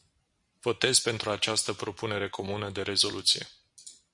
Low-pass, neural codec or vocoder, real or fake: 10.8 kHz; none; real